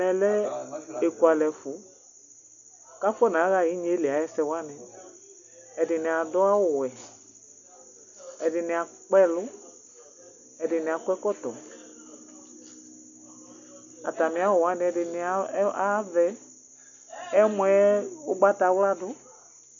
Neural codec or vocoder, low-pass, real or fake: none; 7.2 kHz; real